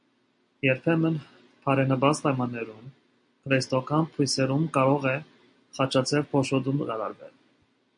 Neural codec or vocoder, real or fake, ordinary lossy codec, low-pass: none; real; MP3, 96 kbps; 9.9 kHz